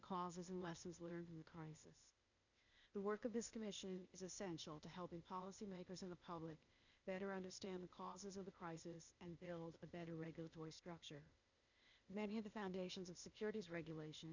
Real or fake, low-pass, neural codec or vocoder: fake; 7.2 kHz; codec, 16 kHz, 0.8 kbps, ZipCodec